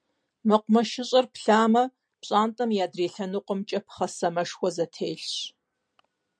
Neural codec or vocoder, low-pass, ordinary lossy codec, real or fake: none; 9.9 kHz; MP3, 64 kbps; real